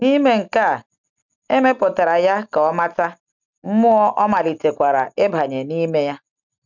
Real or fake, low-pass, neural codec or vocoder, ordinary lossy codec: real; 7.2 kHz; none; none